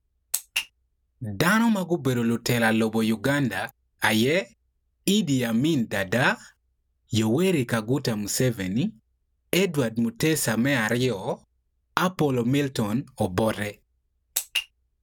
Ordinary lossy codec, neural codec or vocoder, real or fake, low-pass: none; none; real; none